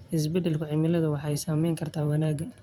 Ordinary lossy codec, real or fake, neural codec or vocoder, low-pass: none; fake; vocoder, 44.1 kHz, 128 mel bands every 512 samples, BigVGAN v2; 19.8 kHz